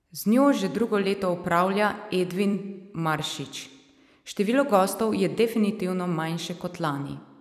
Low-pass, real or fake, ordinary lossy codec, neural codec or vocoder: 14.4 kHz; fake; none; vocoder, 44.1 kHz, 128 mel bands every 256 samples, BigVGAN v2